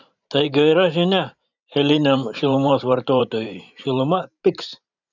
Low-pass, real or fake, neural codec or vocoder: 7.2 kHz; fake; vocoder, 44.1 kHz, 128 mel bands every 512 samples, BigVGAN v2